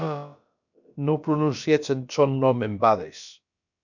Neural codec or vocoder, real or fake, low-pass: codec, 16 kHz, about 1 kbps, DyCAST, with the encoder's durations; fake; 7.2 kHz